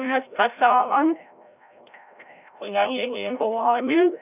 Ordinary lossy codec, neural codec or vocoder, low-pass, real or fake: none; codec, 16 kHz, 0.5 kbps, FreqCodec, larger model; 3.6 kHz; fake